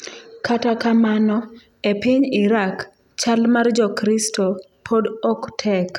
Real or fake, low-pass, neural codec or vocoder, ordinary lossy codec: real; 19.8 kHz; none; none